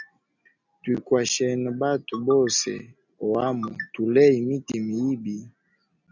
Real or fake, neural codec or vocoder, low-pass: real; none; 7.2 kHz